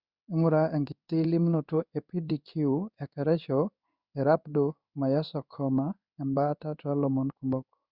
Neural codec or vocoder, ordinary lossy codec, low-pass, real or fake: codec, 16 kHz in and 24 kHz out, 1 kbps, XY-Tokenizer; Opus, 64 kbps; 5.4 kHz; fake